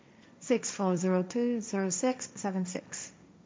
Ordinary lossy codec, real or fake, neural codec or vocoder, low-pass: none; fake; codec, 16 kHz, 1.1 kbps, Voila-Tokenizer; none